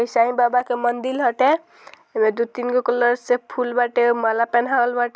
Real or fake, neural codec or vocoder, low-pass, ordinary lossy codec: real; none; none; none